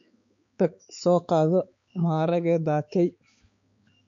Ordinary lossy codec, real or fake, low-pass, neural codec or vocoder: MP3, 48 kbps; fake; 7.2 kHz; codec, 16 kHz, 4 kbps, X-Codec, HuBERT features, trained on balanced general audio